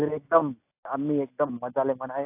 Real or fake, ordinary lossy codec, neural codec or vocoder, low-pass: real; AAC, 24 kbps; none; 3.6 kHz